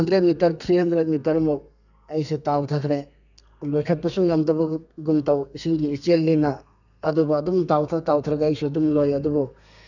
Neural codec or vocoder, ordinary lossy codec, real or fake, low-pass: codec, 32 kHz, 1.9 kbps, SNAC; none; fake; 7.2 kHz